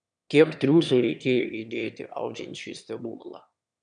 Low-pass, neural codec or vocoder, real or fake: 9.9 kHz; autoencoder, 22.05 kHz, a latent of 192 numbers a frame, VITS, trained on one speaker; fake